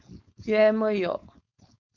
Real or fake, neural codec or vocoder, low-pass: fake; codec, 16 kHz, 4.8 kbps, FACodec; 7.2 kHz